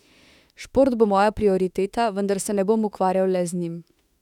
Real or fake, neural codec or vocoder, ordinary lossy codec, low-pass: fake; autoencoder, 48 kHz, 32 numbers a frame, DAC-VAE, trained on Japanese speech; none; 19.8 kHz